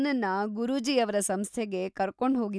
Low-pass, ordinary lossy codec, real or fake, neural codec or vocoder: none; none; real; none